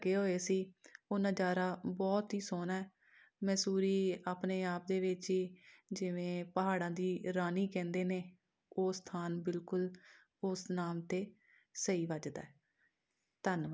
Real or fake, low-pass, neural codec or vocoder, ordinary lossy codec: real; none; none; none